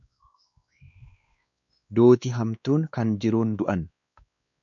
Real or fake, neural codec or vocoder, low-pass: fake; codec, 16 kHz, 2 kbps, X-Codec, WavLM features, trained on Multilingual LibriSpeech; 7.2 kHz